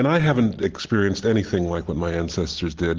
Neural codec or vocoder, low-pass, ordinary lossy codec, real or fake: none; 7.2 kHz; Opus, 16 kbps; real